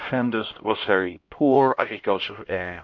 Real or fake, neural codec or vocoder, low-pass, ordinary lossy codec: fake; codec, 16 kHz, 1 kbps, X-Codec, HuBERT features, trained on LibriSpeech; 7.2 kHz; MP3, 48 kbps